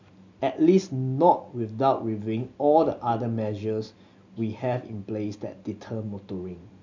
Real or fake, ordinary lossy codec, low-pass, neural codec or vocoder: real; MP3, 64 kbps; 7.2 kHz; none